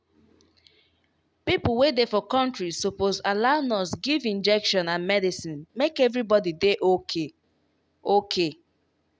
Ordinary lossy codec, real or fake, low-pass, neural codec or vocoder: none; real; none; none